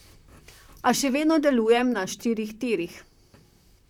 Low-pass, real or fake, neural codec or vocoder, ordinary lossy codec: 19.8 kHz; fake; vocoder, 44.1 kHz, 128 mel bands, Pupu-Vocoder; none